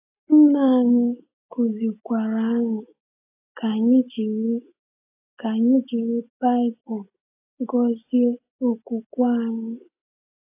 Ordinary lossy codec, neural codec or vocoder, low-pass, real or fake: AAC, 24 kbps; none; 3.6 kHz; real